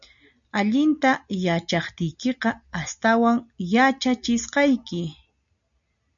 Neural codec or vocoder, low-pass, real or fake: none; 7.2 kHz; real